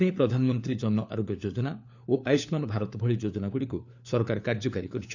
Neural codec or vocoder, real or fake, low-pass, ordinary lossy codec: codec, 16 kHz, 4 kbps, FunCodec, trained on LibriTTS, 50 frames a second; fake; 7.2 kHz; none